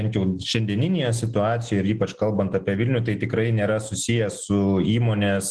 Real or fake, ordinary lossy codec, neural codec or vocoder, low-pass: real; Opus, 16 kbps; none; 10.8 kHz